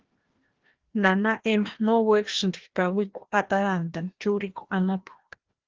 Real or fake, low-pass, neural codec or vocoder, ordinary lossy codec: fake; 7.2 kHz; codec, 16 kHz, 1 kbps, FreqCodec, larger model; Opus, 16 kbps